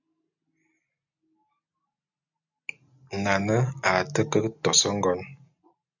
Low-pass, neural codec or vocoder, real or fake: 7.2 kHz; none; real